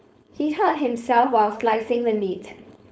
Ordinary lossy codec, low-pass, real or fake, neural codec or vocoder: none; none; fake; codec, 16 kHz, 4.8 kbps, FACodec